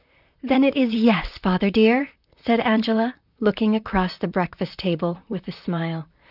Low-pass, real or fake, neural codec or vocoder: 5.4 kHz; fake; vocoder, 44.1 kHz, 128 mel bands, Pupu-Vocoder